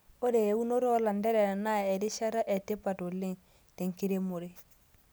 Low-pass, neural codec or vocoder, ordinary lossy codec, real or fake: none; none; none; real